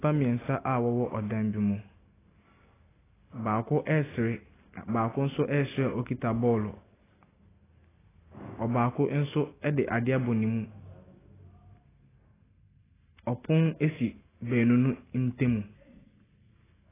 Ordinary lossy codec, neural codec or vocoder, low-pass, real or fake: AAC, 16 kbps; none; 3.6 kHz; real